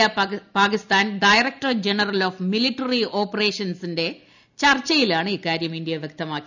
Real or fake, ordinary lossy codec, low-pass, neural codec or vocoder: real; none; 7.2 kHz; none